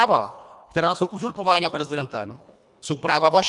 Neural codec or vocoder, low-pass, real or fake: codec, 24 kHz, 1.5 kbps, HILCodec; 10.8 kHz; fake